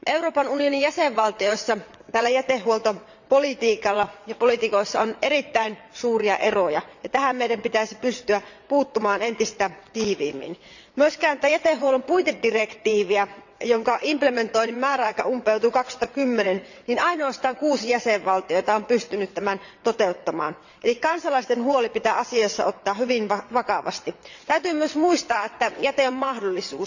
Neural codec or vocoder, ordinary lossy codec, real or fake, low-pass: vocoder, 22.05 kHz, 80 mel bands, WaveNeXt; none; fake; 7.2 kHz